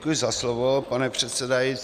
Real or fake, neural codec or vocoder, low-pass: real; none; 14.4 kHz